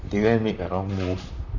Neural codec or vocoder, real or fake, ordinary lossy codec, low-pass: vocoder, 44.1 kHz, 128 mel bands, Pupu-Vocoder; fake; none; 7.2 kHz